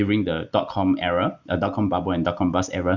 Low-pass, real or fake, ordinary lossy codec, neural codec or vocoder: 7.2 kHz; real; Opus, 64 kbps; none